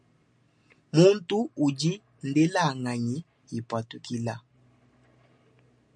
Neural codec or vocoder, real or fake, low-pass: none; real; 9.9 kHz